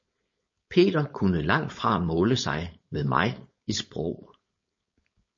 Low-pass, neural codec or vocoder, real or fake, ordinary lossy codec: 7.2 kHz; codec, 16 kHz, 4.8 kbps, FACodec; fake; MP3, 32 kbps